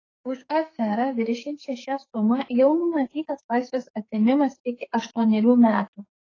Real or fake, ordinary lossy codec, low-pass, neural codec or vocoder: fake; AAC, 32 kbps; 7.2 kHz; codec, 44.1 kHz, 2.6 kbps, SNAC